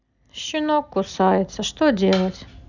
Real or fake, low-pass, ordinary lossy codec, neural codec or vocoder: real; 7.2 kHz; none; none